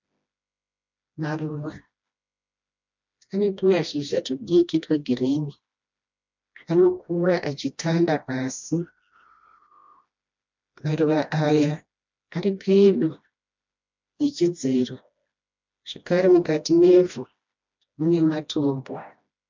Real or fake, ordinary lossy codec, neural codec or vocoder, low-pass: fake; MP3, 64 kbps; codec, 16 kHz, 1 kbps, FreqCodec, smaller model; 7.2 kHz